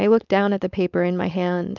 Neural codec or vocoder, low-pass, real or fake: codec, 16 kHz, 4.8 kbps, FACodec; 7.2 kHz; fake